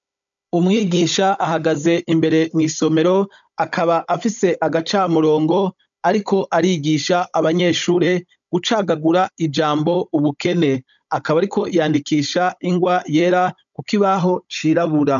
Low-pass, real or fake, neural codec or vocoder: 7.2 kHz; fake; codec, 16 kHz, 16 kbps, FunCodec, trained on Chinese and English, 50 frames a second